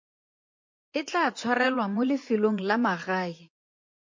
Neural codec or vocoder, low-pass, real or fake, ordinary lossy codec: vocoder, 24 kHz, 100 mel bands, Vocos; 7.2 kHz; fake; MP3, 48 kbps